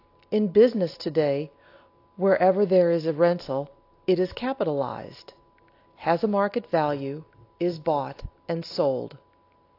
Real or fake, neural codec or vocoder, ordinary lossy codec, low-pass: real; none; AAC, 32 kbps; 5.4 kHz